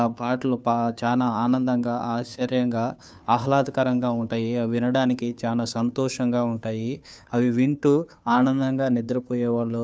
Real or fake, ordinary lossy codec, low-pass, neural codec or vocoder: fake; none; none; codec, 16 kHz, 2 kbps, FunCodec, trained on Chinese and English, 25 frames a second